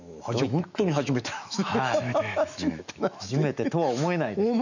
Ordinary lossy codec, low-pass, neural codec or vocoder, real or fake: none; 7.2 kHz; autoencoder, 48 kHz, 128 numbers a frame, DAC-VAE, trained on Japanese speech; fake